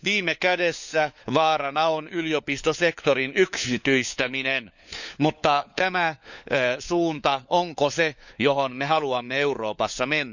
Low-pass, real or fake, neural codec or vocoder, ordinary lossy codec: 7.2 kHz; fake; codec, 16 kHz, 4 kbps, FunCodec, trained on LibriTTS, 50 frames a second; none